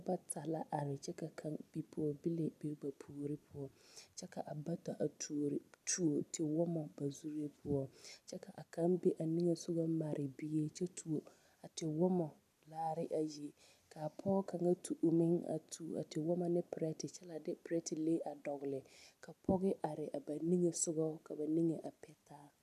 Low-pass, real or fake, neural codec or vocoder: 14.4 kHz; real; none